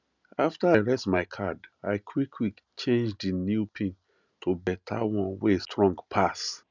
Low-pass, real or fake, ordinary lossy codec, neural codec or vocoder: 7.2 kHz; real; none; none